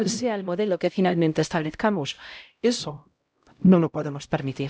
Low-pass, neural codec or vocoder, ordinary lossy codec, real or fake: none; codec, 16 kHz, 0.5 kbps, X-Codec, HuBERT features, trained on LibriSpeech; none; fake